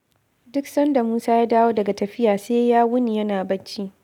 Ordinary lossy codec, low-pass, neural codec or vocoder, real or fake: none; 19.8 kHz; none; real